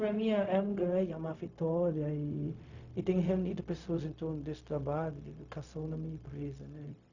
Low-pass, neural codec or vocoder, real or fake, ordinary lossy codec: 7.2 kHz; codec, 16 kHz, 0.4 kbps, LongCat-Audio-Codec; fake; none